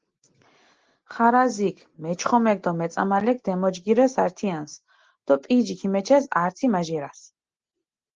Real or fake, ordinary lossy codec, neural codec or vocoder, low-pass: real; Opus, 16 kbps; none; 7.2 kHz